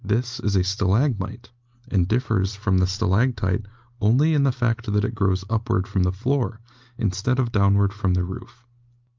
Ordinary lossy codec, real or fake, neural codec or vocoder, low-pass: Opus, 24 kbps; real; none; 7.2 kHz